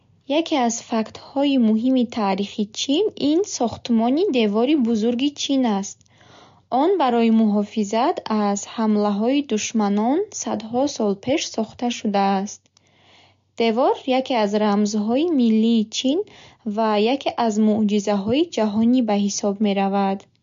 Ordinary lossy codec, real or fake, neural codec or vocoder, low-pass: MP3, 48 kbps; real; none; 7.2 kHz